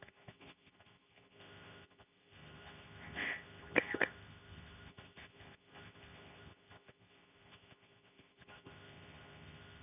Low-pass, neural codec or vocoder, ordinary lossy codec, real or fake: 3.6 kHz; codec, 24 kHz, 0.9 kbps, WavTokenizer, medium speech release version 2; none; fake